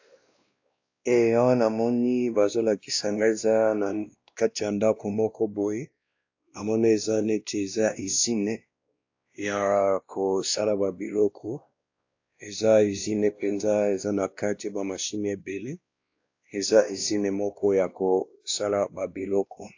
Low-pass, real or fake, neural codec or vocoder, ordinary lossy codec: 7.2 kHz; fake; codec, 16 kHz, 1 kbps, X-Codec, WavLM features, trained on Multilingual LibriSpeech; AAC, 48 kbps